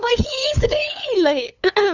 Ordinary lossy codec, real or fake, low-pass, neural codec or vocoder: none; fake; 7.2 kHz; codec, 16 kHz, 2 kbps, FunCodec, trained on LibriTTS, 25 frames a second